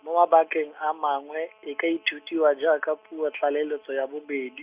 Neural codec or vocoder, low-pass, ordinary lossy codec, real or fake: none; 3.6 kHz; Opus, 64 kbps; real